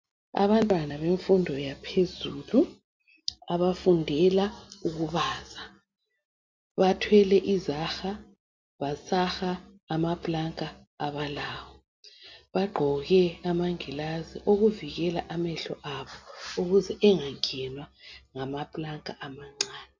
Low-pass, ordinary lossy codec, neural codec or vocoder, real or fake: 7.2 kHz; MP3, 64 kbps; none; real